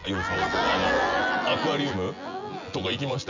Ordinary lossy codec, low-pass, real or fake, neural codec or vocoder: none; 7.2 kHz; fake; vocoder, 24 kHz, 100 mel bands, Vocos